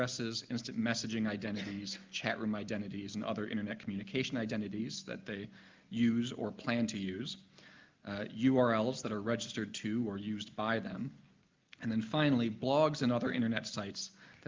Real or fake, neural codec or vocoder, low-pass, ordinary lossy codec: real; none; 7.2 kHz; Opus, 24 kbps